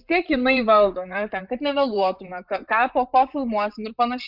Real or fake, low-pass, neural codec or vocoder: fake; 5.4 kHz; vocoder, 44.1 kHz, 128 mel bands, Pupu-Vocoder